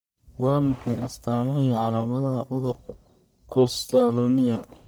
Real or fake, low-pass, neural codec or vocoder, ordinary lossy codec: fake; none; codec, 44.1 kHz, 1.7 kbps, Pupu-Codec; none